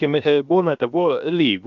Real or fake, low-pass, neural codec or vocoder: fake; 7.2 kHz; codec, 16 kHz, 0.7 kbps, FocalCodec